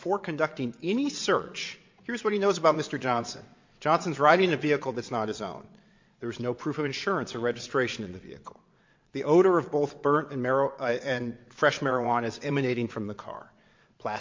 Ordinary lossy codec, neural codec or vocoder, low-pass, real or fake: MP3, 48 kbps; vocoder, 22.05 kHz, 80 mel bands, WaveNeXt; 7.2 kHz; fake